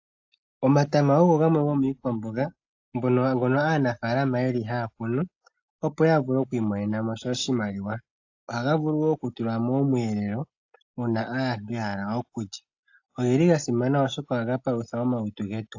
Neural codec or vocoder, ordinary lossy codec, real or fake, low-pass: none; AAC, 48 kbps; real; 7.2 kHz